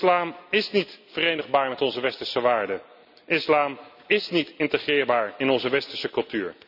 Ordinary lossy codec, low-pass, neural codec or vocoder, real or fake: none; 5.4 kHz; none; real